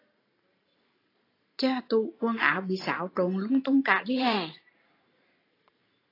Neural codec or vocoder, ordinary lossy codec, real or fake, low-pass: vocoder, 44.1 kHz, 128 mel bands every 512 samples, BigVGAN v2; AAC, 24 kbps; fake; 5.4 kHz